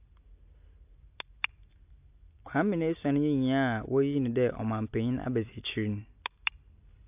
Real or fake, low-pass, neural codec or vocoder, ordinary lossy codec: real; 3.6 kHz; none; AAC, 32 kbps